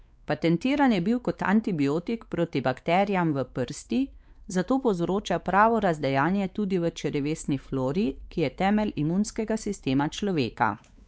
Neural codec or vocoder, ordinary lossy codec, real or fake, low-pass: codec, 16 kHz, 4 kbps, X-Codec, WavLM features, trained on Multilingual LibriSpeech; none; fake; none